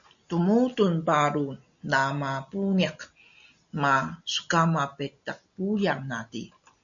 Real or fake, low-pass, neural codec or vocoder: real; 7.2 kHz; none